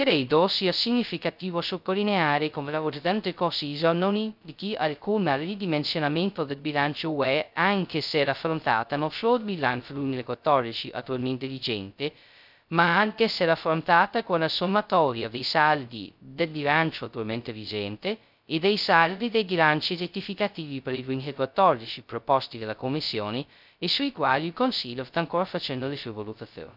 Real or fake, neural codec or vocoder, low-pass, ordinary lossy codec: fake; codec, 16 kHz, 0.2 kbps, FocalCodec; 5.4 kHz; none